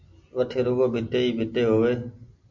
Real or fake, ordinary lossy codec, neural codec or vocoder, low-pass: real; MP3, 48 kbps; none; 7.2 kHz